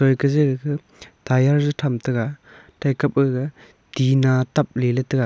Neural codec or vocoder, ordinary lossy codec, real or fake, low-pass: none; none; real; none